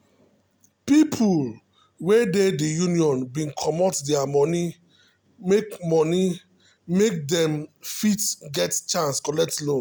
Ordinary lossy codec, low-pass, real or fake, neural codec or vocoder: none; none; real; none